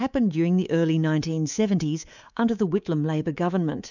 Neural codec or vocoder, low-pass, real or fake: codec, 24 kHz, 3.1 kbps, DualCodec; 7.2 kHz; fake